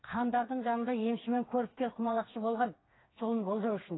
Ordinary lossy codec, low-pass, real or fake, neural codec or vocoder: AAC, 16 kbps; 7.2 kHz; fake; codec, 16 kHz, 4 kbps, FreqCodec, smaller model